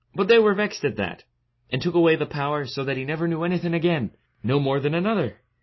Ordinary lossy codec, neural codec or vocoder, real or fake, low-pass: MP3, 24 kbps; none; real; 7.2 kHz